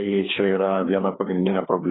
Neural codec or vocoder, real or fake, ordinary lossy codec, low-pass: codec, 16 kHz, 2 kbps, FreqCodec, larger model; fake; AAC, 16 kbps; 7.2 kHz